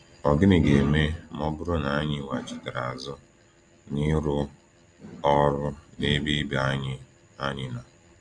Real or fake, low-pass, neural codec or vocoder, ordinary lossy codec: real; 9.9 kHz; none; none